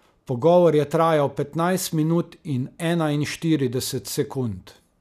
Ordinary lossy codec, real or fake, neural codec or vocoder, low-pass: none; real; none; 14.4 kHz